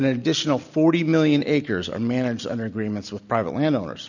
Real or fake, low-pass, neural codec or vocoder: real; 7.2 kHz; none